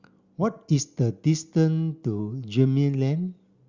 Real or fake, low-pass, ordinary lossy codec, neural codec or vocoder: real; 7.2 kHz; Opus, 64 kbps; none